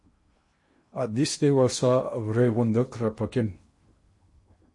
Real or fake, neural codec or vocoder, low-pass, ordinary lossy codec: fake; codec, 16 kHz in and 24 kHz out, 0.8 kbps, FocalCodec, streaming, 65536 codes; 10.8 kHz; MP3, 48 kbps